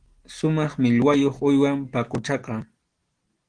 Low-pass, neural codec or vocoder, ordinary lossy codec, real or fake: 9.9 kHz; none; Opus, 16 kbps; real